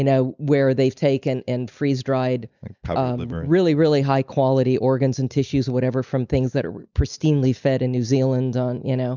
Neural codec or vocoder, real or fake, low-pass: none; real; 7.2 kHz